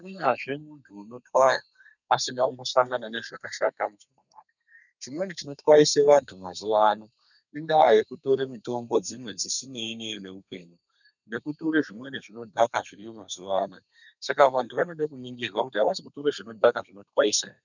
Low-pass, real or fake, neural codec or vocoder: 7.2 kHz; fake; codec, 32 kHz, 1.9 kbps, SNAC